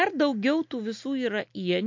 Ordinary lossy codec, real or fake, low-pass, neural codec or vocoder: MP3, 48 kbps; real; 7.2 kHz; none